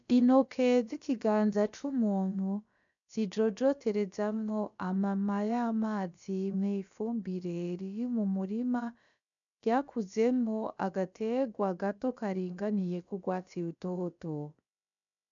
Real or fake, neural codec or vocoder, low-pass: fake; codec, 16 kHz, about 1 kbps, DyCAST, with the encoder's durations; 7.2 kHz